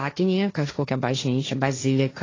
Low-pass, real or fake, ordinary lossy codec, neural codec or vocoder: 7.2 kHz; fake; AAC, 32 kbps; codec, 16 kHz, 1.1 kbps, Voila-Tokenizer